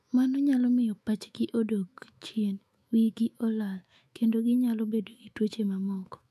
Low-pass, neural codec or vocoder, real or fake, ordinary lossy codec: 14.4 kHz; autoencoder, 48 kHz, 128 numbers a frame, DAC-VAE, trained on Japanese speech; fake; none